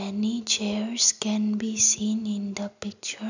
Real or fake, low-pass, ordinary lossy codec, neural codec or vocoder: real; 7.2 kHz; none; none